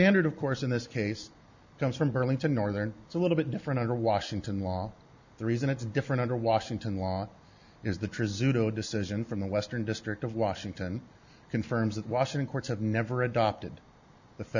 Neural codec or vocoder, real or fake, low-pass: none; real; 7.2 kHz